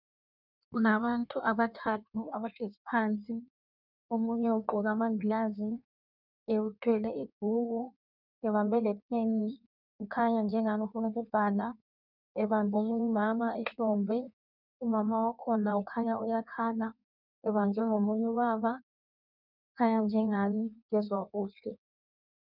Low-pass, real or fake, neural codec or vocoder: 5.4 kHz; fake; codec, 16 kHz in and 24 kHz out, 1.1 kbps, FireRedTTS-2 codec